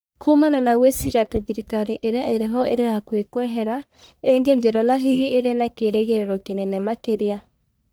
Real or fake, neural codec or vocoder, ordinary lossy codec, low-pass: fake; codec, 44.1 kHz, 1.7 kbps, Pupu-Codec; none; none